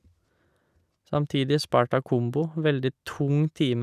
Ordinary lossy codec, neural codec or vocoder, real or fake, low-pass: none; none; real; 14.4 kHz